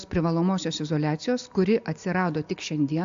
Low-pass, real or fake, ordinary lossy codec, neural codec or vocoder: 7.2 kHz; real; AAC, 64 kbps; none